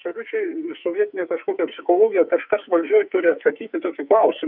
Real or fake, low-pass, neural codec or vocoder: fake; 5.4 kHz; codec, 44.1 kHz, 2.6 kbps, SNAC